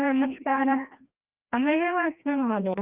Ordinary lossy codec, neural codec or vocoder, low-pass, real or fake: Opus, 32 kbps; codec, 16 kHz, 1 kbps, FreqCodec, larger model; 3.6 kHz; fake